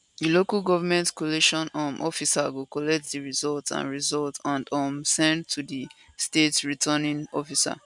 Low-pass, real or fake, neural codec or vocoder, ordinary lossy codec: 10.8 kHz; real; none; none